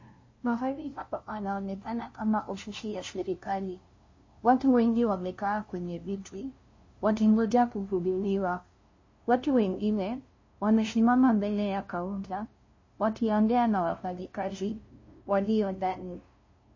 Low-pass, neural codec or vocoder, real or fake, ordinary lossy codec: 7.2 kHz; codec, 16 kHz, 0.5 kbps, FunCodec, trained on LibriTTS, 25 frames a second; fake; MP3, 32 kbps